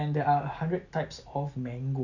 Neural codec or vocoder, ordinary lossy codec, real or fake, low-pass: none; none; real; 7.2 kHz